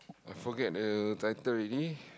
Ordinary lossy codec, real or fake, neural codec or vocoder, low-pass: none; real; none; none